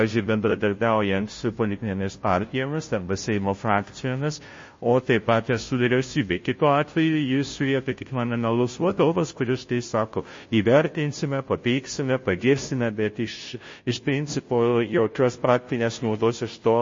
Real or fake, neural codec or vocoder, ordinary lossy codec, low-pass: fake; codec, 16 kHz, 0.5 kbps, FunCodec, trained on Chinese and English, 25 frames a second; MP3, 32 kbps; 7.2 kHz